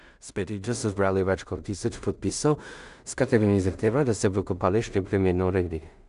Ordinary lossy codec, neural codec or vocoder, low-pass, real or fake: none; codec, 16 kHz in and 24 kHz out, 0.4 kbps, LongCat-Audio-Codec, two codebook decoder; 10.8 kHz; fake